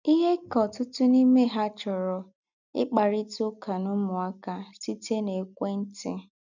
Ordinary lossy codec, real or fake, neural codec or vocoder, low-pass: none; real; none; 7.2 kHz